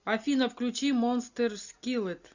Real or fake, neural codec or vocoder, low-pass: real; none; 7.2 kHz